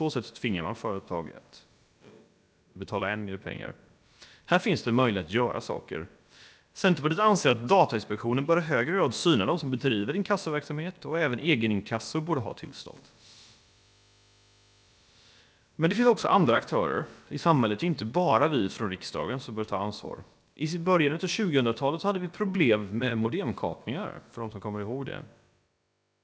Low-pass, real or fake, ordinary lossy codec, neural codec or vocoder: none; fake; none; codec, 16 kHz, about 1 kbps, DyCAST, with the encoder's durations